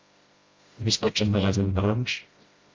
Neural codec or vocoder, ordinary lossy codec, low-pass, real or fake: codec, 16 kHz, 0.5 kbps, FreqCodec, smaller model; Opus, 32 kbps; 7.2 kHz; fake